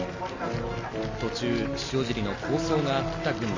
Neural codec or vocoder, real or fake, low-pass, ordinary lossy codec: none; real; 7.2 kHz; MP3, 32 kbps